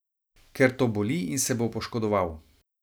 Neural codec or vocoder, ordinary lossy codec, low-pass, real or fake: none; none; none; real